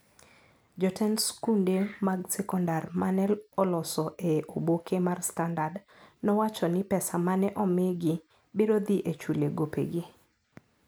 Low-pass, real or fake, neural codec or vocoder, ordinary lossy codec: none; real; none; none